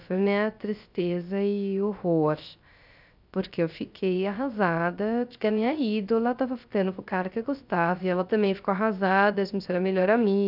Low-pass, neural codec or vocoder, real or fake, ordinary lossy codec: 5.4 kHz; codec, 16 kHz, 0.3 kbps, FocalCodec; fake; none